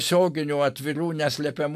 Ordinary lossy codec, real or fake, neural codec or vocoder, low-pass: AAC, 96 kbps; real; none; 14.4 kHz